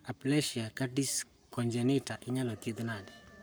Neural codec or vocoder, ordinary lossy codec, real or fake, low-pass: codec, 44.1 kHz, 7.8 kbps, Pupu-Codec; none; fake; none